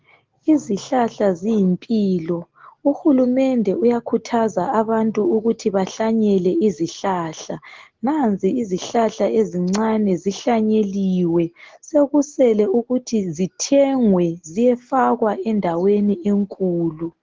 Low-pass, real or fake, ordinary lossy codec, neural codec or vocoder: 7.2 kHz; real; Opus, 16 kbps; none